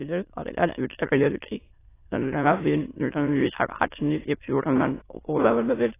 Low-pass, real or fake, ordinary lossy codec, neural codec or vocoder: 3.6 kHz; fake; AAC, 16 kbps; autoencoder, 22.05 kHz, a latent of 192 numbers a frame, VITS, trained on many speakers